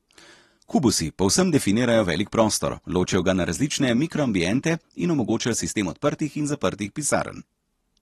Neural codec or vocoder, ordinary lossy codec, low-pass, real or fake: none; AAC, 32 kbps; 19.8 kHz; real